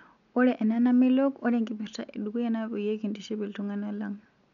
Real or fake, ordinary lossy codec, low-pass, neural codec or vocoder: real; none; 7.2 kHz; none